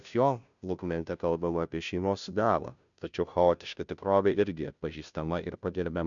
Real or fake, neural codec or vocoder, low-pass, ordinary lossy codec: fake; codec, 16 kHz, 0.5 kbps, FunCodec, trained on Chinese and English, 25 frames a second; 7.2 kHz; MP3, 96 kbps